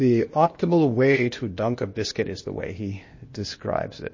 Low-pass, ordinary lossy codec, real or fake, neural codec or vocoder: 7.2 kHz; MP3, 32 kbps; fake; codec, 16 kHz, 0.8 kbps, ZipCodec